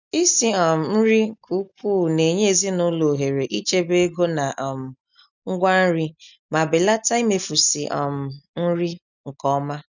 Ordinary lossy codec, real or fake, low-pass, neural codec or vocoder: none; real; 7.2 kHz; none